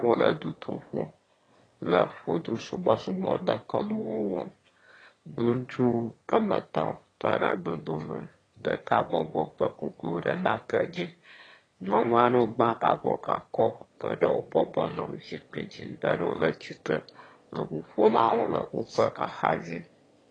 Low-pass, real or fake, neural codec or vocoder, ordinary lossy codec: 9.9 kHz; fake; autoencoder, 22.05 kHz, a latent of 192 numbers a frame, VITS, trained on one speaker; AAC, 32 kbps